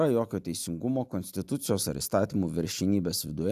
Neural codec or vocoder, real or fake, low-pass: none; real; 14.4 kHz